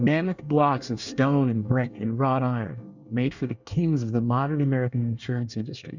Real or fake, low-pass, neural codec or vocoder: fake; 7.2 kHz; codec, 24 kHz, 1 kbps, SNAC